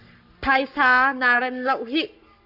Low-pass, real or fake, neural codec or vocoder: 5.4 kHz; fake; codec, 44.1 kHz, 7.8 kbps, Pupu-Codec